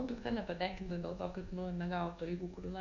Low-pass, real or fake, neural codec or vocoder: 7.2 kHz; fake; codec, 24 kHz, 1.2 kbps, DualCodec